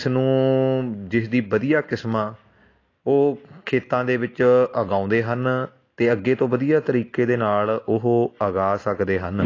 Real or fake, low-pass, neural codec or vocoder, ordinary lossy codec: real; 7.2 kHz; none; AAC, 32 kbps